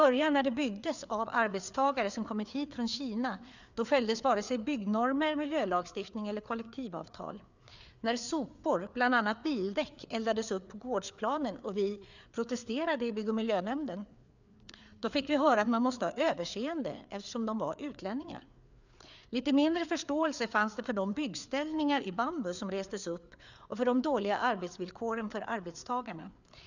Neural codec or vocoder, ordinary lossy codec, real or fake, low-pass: codec, 16 kHz, 4 kbps, FreqCodec, larger model; none; fake; 7.2 kHz